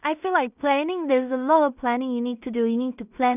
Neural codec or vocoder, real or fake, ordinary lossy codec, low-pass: codec, 16 kHz in and 24 kHz out, 0.4 kbps, LongCat-Audio-Codec, two codebook decoder; fake; none; 3.6 kHz